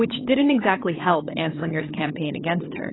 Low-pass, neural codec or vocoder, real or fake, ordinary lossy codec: 7.2 kHz; codec, 16 kHz, 8 kbps, FunCodec, trained on LibriTTS, 25 frames a second; fake; AAC, 16 kbps